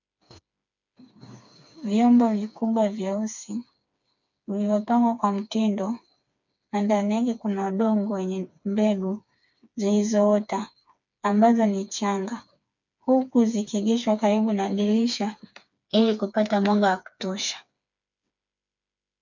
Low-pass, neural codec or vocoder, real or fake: 7.2 kHz; codec, 16 kHz, 4 kbps, FreqCodec, smaller model; fake